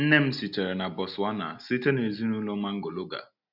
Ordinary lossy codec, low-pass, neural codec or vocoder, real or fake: none; 5.4 kHz; none; real